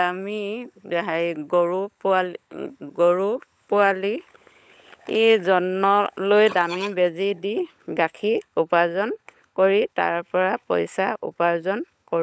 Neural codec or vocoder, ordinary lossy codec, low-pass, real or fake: codec, 16 kHz, 16 kbps, FunCodec, trained on LibriTTS, 50 frames a second; none; none; fake